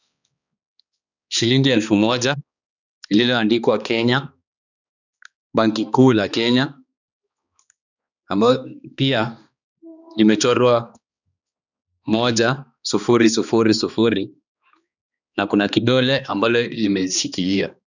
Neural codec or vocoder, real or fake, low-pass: codec, 16 kHz, 2 kbps, X-Codec, HuBERT features, trained on balanced general audio; fake; 7.2 kHz